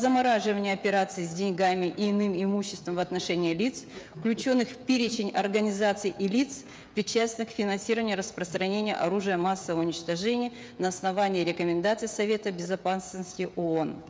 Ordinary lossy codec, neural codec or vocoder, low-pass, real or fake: none; codec, 16 kHz, 16 kbps, FreqCodec, smaller model; none; fake